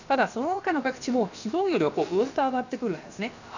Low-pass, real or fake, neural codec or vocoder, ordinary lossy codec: 7.2 kHz; fake; codec, 16 kHz, about 1 kbps, DyCAST, with the encoder's durations; none